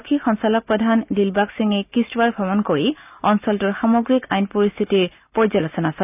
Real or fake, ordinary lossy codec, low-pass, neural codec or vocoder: real; none; 3.6 kHz; none